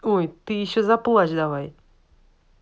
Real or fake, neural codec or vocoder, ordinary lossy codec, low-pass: real; none; none; none